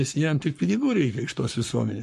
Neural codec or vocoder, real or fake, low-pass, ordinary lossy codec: codec, 44.1 kHz, 7.8 kbps, Pupu-Codec; fake; 14.4 kHz; MP3, 64 kbps